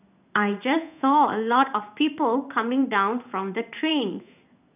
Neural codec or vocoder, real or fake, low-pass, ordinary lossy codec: codec, 16 kHz in and 24 kHz out, 1 kbps, XY-Tokenizer; fake; 3.6 kHz; none